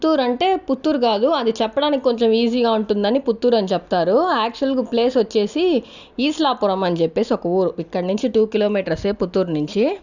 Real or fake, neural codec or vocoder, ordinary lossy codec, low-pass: real; none; none; 7.2 kHz